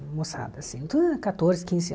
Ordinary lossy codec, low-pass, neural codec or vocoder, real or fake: none; none; none; real